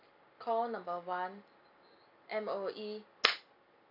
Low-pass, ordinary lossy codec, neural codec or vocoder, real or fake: 5.4 kHz; AAC, 48 kbps; none; real